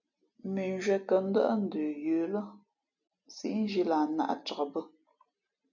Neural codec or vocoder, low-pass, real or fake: none; 7.2 kHz; real